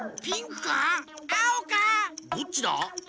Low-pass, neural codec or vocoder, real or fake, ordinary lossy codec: none; none; real; none